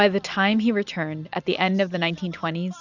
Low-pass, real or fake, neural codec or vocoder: 7.2 kHz; real; none